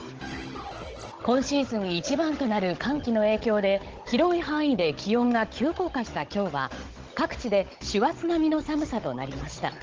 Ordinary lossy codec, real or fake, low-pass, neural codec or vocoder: Opus, 16 kbps; fake; 7.2 kHz; codec, 16 kHz, 16 kbps, FunCodec, trained on Chinese and English, 50 frames a second